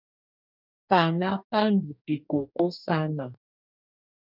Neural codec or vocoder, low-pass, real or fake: codec, 44.1 kHz, 2.6 kbps, DAC; 5.4 kHz; fake